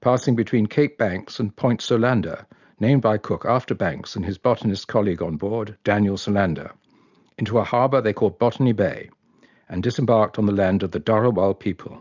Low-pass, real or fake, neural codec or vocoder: 7.2 kHz; real; none